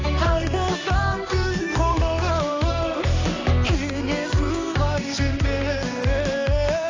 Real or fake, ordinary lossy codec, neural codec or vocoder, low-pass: fake; AAC, 32 kbps; codec, 16 kHz, 2 kbps, X-Codec, HuBERT features, trained on balanced general audio; 7.2 kHz